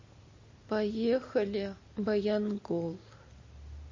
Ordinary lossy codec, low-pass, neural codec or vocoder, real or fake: MP3, 32 kbps; 7.2 kHz; none; real